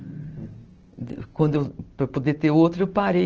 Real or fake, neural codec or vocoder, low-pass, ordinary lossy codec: real; none; 7.2 kHz; Opus, 16 kbps